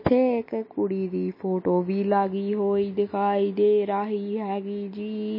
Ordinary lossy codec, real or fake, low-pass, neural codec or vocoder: MP3, 24 kbps; real; 5.4 kHz; none